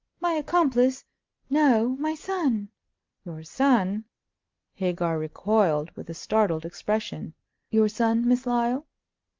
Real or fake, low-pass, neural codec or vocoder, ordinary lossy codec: real; 7.2 kHz; none; Opus, 32 kbps